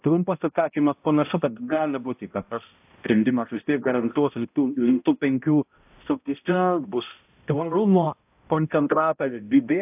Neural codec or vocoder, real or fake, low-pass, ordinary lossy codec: codec, 16 kHz, 0.5 kbps, X-Codec, HuBERT features, trained on balanced general audio; fake; 3.6 kHz; AAC, 32 kbps